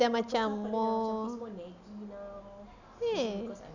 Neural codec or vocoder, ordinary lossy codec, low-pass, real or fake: none; none; 7.2 kHz; real